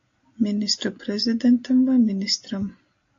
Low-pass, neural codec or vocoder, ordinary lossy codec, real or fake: 7.2 kHz; none; AAC, 48 kbps; real